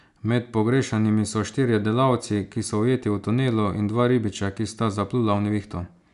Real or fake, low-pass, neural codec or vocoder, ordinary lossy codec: real; 10.8 kHz; none; none